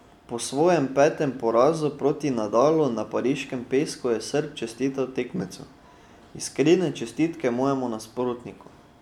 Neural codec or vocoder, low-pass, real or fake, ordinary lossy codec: none; 19.8 kHz; real; none